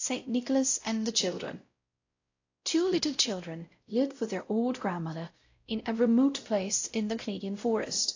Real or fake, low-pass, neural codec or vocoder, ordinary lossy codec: fake; 7.2 kHz; codec, 16 kHz, 0.5 kbps, X-Codec, WavLM features, trained on Multilingual LibriSpeech; AAC, 48 kbps